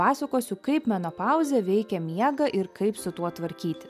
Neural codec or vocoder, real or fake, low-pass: none; real; 14.4 kHz